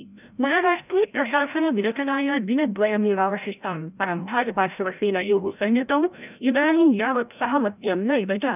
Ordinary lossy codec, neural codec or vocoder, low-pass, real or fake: none; codec, 16 kHz, 0.5 kbps, FreqCodec, larger model; 3.6 kHz; fake